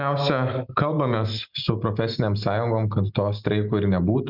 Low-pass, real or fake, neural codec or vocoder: 5.4 kHz; real; none